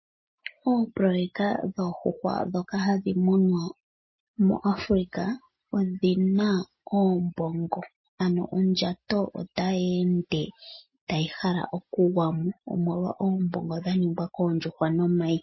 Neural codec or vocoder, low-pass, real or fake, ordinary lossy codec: none; 7.2 kHz; real; MP3, 24 kbps